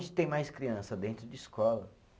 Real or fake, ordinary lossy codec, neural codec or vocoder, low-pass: real; none; none; none